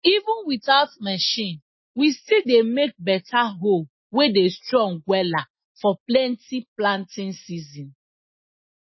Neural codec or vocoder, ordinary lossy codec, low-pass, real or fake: none; MP3, 24 kbps; 7.2 kHz; real